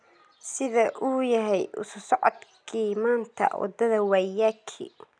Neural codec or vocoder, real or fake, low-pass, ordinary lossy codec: none; real; 9.9 kHz; none